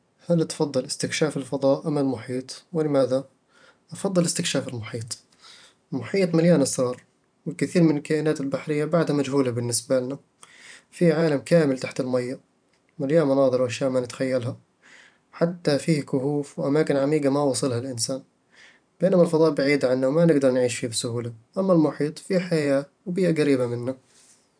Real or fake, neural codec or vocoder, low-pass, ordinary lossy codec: fake; vocoder, 44.1 kHz, 128 mel bands every 512 samples, BigVGAN v2; 9.9 kHz; none